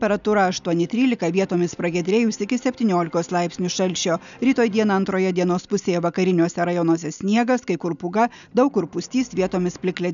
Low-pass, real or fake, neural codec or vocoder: 7.2 kHz; real; none